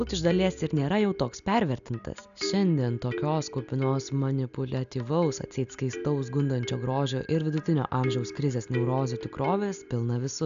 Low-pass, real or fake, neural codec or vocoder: 7.2 kHz; real; none